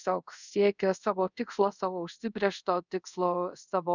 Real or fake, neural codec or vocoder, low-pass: fake; codec, 24 kHz, 0.5 kbps, DualCodec; 7.2 kHz